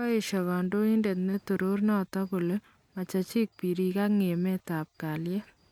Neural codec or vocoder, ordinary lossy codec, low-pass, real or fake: none; MP3, 96 kbps; 19.8 kHz; real